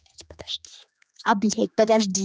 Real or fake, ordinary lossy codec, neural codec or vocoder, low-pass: fake; none; codec, 16 kHz, 2 kbps, X-Codec, HuBERT features, trained on general audio; none